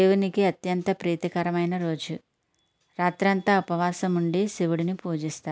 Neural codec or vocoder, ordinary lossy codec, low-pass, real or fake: none; none; none; real